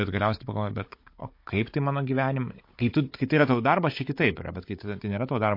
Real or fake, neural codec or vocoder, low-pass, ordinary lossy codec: fake; codec, 16 kHz, 16 kbps, FunCodec, trained on Chinese and English, 50 frames a second; 5.4 kHz; MP3, 32 kbps